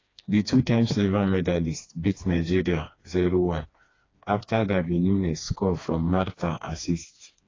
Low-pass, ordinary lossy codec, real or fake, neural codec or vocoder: 7.2 kHz; AAC, 32 kbps; fake; codec, 16 kHz, 2 kbps, FreqCodec, smaller model